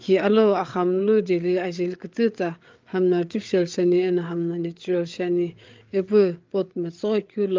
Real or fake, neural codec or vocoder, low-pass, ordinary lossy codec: fake; codec, 16 kHz, 8 kbps, FreqCodec, larger model; 7.2 kHz; Opus, 24 kbps